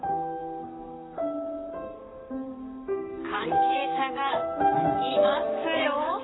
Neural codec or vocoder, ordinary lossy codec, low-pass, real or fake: vocoder, 44.1 kHz, 128 mel bands, Pupu-Vocoder; AAC, 16 kbps; 7.2 kHz; fake